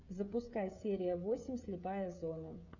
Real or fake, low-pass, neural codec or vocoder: fake; 7.2 kHz; codec, 16 kHz, 16 kbps, FreqCodec, smaller model